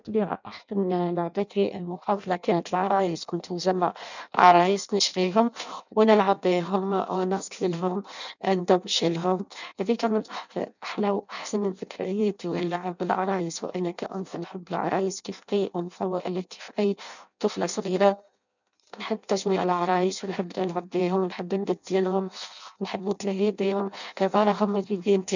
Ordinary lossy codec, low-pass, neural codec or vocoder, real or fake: none; 7.2 kHz; codec, 16 kHz in and 24 kHz out, 0.6 kbps, FireRedTTS-2 codec; fake